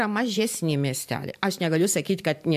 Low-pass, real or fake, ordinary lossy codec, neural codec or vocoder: 14.4 kHz; fake; AAC, 96 kbps; vocoder, 44.1 kHz, 128 mel bands every 512 samples, BigVGAN v2